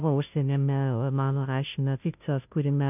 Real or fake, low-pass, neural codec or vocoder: fake; 3.6 kHz; codec, 16 kHz, 0.5 kbps, FunCodec, trained on Chinese and English, 25 frames a second